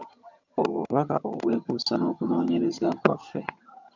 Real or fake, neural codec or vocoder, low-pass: fake; vocoder, 22.05 kHz, 80 mel bands, HiFi-GAN; 7.2 kHz